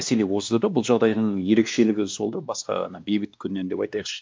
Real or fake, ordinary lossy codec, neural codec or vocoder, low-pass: fake; none; codec, 16 kHz, 2 kbps, X-Codec, WavLM features, trained on Multilingual LibriSpeech; none